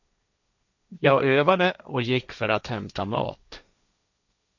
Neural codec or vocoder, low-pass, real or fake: codec, 16 kHz, 1.1 kbps, Voila-Tokenizer; 7.2 kHz; fake